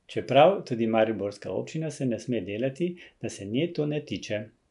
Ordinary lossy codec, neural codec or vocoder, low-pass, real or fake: none; none; 10.8 kHz; real